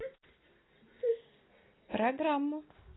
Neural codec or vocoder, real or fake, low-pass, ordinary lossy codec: none; real; 7.2 kHz; AAC, 16 kbps